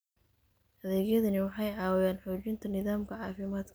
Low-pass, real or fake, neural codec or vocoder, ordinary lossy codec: none; real; none; none